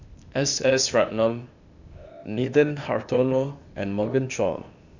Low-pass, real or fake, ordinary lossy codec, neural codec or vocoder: 7.2 kHz; fake; none; codec, 16 kHz, 0.8 kbps, ZipCodec